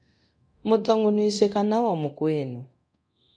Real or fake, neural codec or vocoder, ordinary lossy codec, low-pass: fake; codec, 24 kHz, 0.9 kbps, DualCodec; MP3, 96 kbps; 9.9 kHz